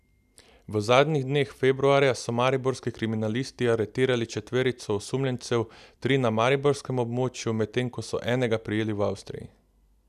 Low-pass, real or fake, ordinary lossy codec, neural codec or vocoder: 14.4 kHz; real; none; none